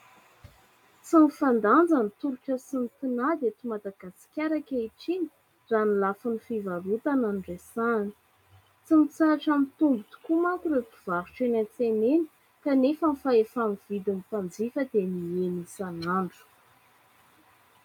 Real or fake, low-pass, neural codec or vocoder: real; 19.8 kHz; none